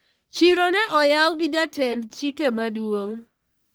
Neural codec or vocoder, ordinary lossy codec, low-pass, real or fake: codec, 44.1 kHz, 1.7 kbps, Pupu-Codec; none; none; fake